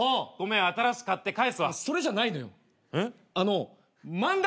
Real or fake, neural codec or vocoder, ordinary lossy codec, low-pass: real; none; none; none